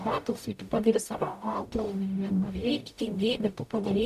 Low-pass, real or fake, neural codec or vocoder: 14.4 kHz; fake; codec, 44.1 kHz, 0.9 kbps, DAC